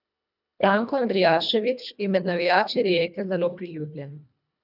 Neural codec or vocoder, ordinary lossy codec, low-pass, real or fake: codec, 24 kHz, 1.5 kbps, HILCodec; none; 5.4 kHz; fake